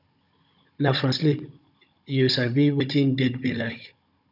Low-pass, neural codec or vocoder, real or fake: 5.4 kHz; codec, 16 kHz, 16 kbps, FunCodec, trained on Chinese and English, 50 frames a second; fake